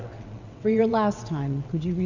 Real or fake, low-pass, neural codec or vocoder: fake; 7.2 kHz; vocoder, 22.05 kHz, 80 mel bands, WaveNeXt